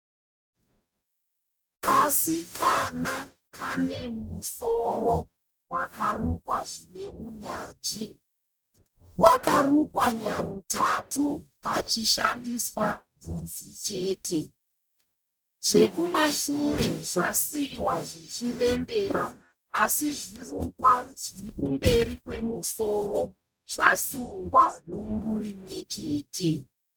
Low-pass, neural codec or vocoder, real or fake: 19.8 kHz; codec, 44.1 kHz, 0.9 kbps, DAC; fake